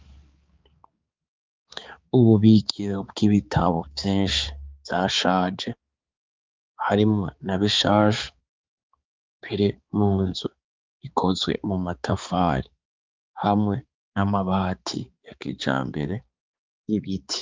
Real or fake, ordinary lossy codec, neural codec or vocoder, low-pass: fake; Opus, 24 kbps; codec, 16 kHz, 4 kbps, X-Codec, HuBERT features, trained on balanced general audio; 7.2 kHz